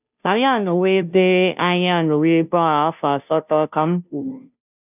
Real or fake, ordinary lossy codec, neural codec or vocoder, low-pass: fake; none; codec, 16 kHz, 0.5 kbps, FunCodec, trained on Chinese and English, 25 frames a second; 3.6 kHz